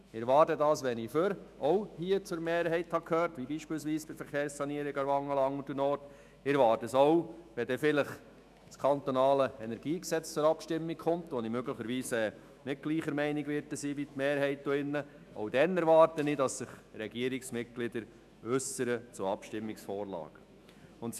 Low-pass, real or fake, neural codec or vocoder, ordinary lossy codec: 14.4 kHz; fake; autoencoder, 48 kHz, 128 numbers a frame, DAC-VAE, trained on Japanese speech; none